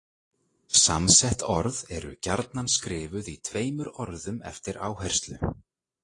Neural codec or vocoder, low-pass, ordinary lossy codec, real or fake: none; 10.8 kHz; AAC, 32 kbps; real